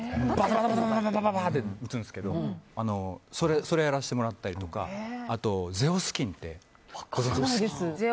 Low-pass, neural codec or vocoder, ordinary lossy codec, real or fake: none; none; none; real